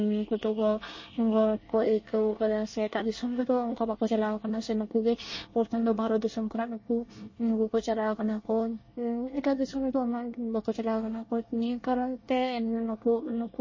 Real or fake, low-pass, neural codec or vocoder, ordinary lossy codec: fake; 7.2 kHz; codec, 24 kHz, 1 kbps, SNAC; MP3, 32 kbps